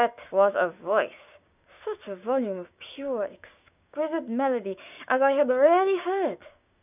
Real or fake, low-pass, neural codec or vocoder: fake; 3.6 kHz; codec, 16 kHz, 6 kbps, DAC